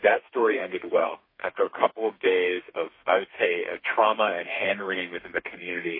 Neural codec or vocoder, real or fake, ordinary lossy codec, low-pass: codec, 32 kHz, 1.9 kbps, SNAC; fake; MP3, 24 kbps; 5.4 kHz